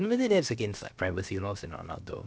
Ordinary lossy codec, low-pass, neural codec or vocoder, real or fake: none; none; codec, 16 kHz, about 1 kbps, DyCAST, with the encoder's durations; fake